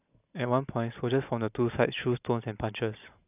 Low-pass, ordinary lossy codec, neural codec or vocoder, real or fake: 3.6 kHz; none; none; real